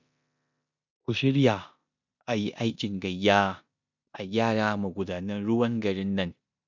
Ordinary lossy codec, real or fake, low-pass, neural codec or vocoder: none; fake; 7.2 kHz; codec, 16 kHz in and 24 kHz out, 0.9 kbps, LongCat-Audio-Codec, four codebook decoder